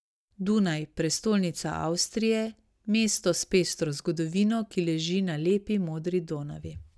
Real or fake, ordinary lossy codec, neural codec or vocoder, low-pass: real; none; none; none